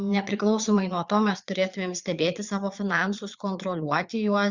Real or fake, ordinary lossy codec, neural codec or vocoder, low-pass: fake; Opus, 64 kbps; vocoder, 22.05 kHz, 80 mel bands, Vocos; 7.2 kHz